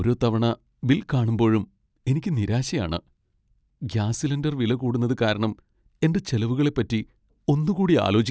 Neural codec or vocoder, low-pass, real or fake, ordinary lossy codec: none; none; real; none